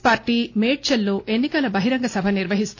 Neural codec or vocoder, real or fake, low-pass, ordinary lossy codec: none; real; 7.2 kHz; AAC, 48 kbps